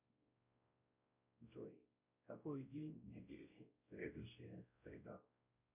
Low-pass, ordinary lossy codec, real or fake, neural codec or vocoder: 3.6 kHz; MP3, 32 kbps; fake; codec, 16 kHz, 0.5 kbps, X-Codec, WavLM features, trained on Multilingual LibriSpeech